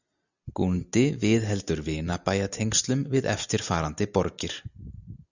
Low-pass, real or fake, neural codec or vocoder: 7.2 kHz; real; none